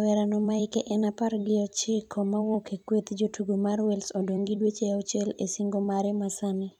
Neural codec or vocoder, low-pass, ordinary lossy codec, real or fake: vocoder, 44.1 kHz, 128 mel bands every 256 samples, BigVGAN v2; 19.8 kHz; none; fake